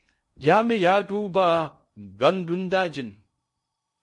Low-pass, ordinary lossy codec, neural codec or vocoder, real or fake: 10.8 kHz; MP3, 48 kbps; codec, 16 kHz in and 24 kHz out, 0.6 kbps, FocalCodec, streaming, 2048 codes; fake